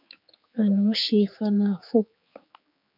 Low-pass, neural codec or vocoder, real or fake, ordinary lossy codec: 5.4 kHz; codec, 16 kHz, 4 kbps, X-Codec, HuBERT features, trained on general audio; fake; MP3, 48 kbps